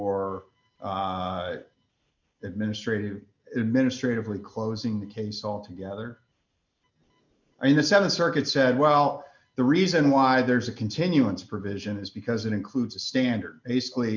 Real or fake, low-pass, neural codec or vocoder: real; 7.2 kHz; none